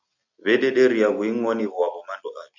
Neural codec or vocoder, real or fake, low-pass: none; real; 7.2 kHz